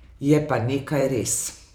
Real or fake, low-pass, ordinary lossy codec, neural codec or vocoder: fake; none; none; vocoder, 44.1 kHz, 128 mel bands every 512 samples, BigVGAN v2